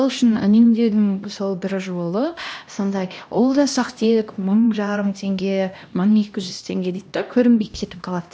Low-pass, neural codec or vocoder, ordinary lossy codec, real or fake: none; codec, 16 kHz, 1 kbps, X-Codec, HuBERT features, trained on LibriSpeech; none; fake